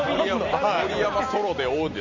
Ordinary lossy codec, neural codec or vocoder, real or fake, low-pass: none; none; real; 7.2 kHz